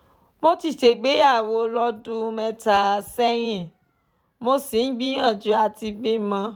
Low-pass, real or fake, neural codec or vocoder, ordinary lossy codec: 19.8 kHz; fake; vocoder, 44.1 kHz, 128 mel bands every 512 samples, BigVGAN v2; none